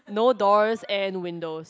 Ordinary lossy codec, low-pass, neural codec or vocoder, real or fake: none; none; none; real